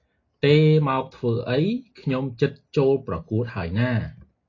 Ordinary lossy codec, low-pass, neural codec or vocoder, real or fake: AAC, 32 kbps; 7.2 kHz; none; real